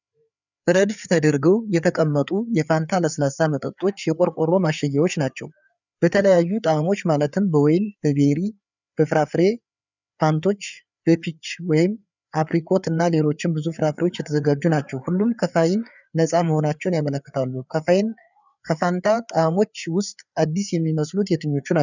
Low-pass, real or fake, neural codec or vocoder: 7.2 kHz; fake; codec, 16 kHz, 4 kbps, FreqCodec, larger model